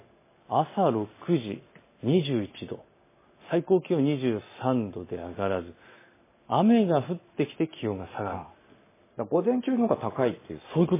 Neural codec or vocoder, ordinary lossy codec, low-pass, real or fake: none; MP3, 16 kbps; 3.6 kHz; real